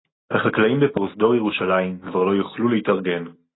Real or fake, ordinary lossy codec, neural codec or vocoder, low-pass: real; AAC, 16 kbps; none; 7.2 kHz